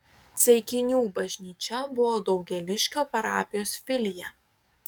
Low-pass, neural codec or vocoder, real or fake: 19.8 kHz; codec, 44.1 kHz, 7.8 kbps, DAC; fake